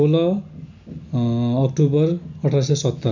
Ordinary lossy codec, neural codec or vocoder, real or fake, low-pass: none; none; real; 7.2 kHz